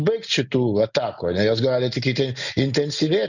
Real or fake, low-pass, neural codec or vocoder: real; 7.2 kHz; none